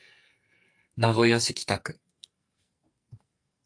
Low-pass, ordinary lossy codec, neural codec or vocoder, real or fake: 9.9 kHz; AAC, 64 kbps; codec, 44.1 kHz, 2.6 kbps, SNAC; fake